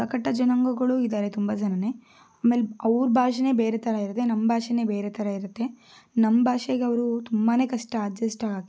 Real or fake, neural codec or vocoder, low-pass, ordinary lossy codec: real; none; none; none